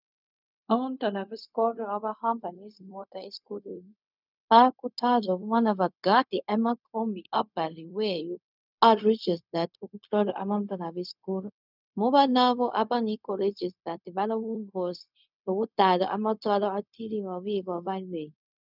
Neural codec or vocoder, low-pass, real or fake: codec, 16 kHz, 0.4 kbps, LongCat-Audio-Codec; 5.4 kHz; fake